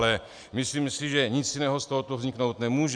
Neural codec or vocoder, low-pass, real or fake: none; 9.9 kHz; real